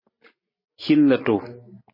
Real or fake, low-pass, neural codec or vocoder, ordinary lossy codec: real; 5.4 kHz; none; MP3, 24 kbps